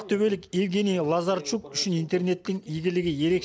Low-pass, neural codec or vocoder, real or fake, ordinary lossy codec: none; none; real; none